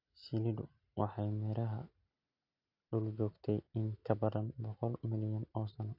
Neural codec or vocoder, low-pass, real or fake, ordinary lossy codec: none; 5.4 kHz; real; AAC, 48 kbps